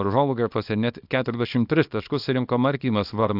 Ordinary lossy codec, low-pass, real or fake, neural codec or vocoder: AAC, 48 kbps; 5.4 kHz; fake; codec, 24 kHz, 0.9 kbps, WavTokenizer, small release